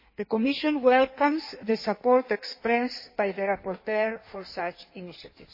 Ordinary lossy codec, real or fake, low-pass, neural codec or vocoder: MP3, 24 kbps; fake; 5.4 kHz; codec, 16 kHz in and 24 kHz out, 1.1 kbps, FireRedTTS-2 codec